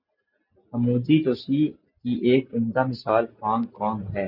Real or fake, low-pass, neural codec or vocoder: real; 5.4 kHz; none